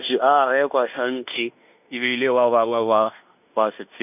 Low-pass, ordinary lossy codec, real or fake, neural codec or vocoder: 3.6 kHz; none; fake; codec, 16 kHz in and 24 kHz out, 0.9 kbps, LongCat-Audio-Codec, fine tuned four codebook decoder